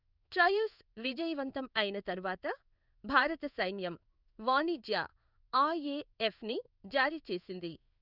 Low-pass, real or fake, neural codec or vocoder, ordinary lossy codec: 5.4 kHz; fake; codec, 16 kHz in and 24 kHz out, 1 kbps, XY-Tokenizer; none